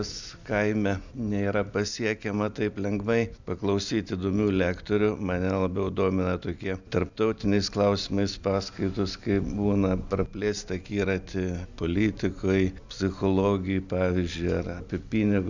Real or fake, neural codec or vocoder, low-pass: real; none; 7.2 kHz